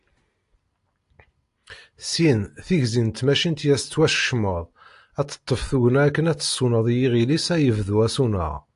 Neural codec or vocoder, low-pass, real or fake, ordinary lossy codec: none; 10.8 kHz; real; AAC, 64 kbps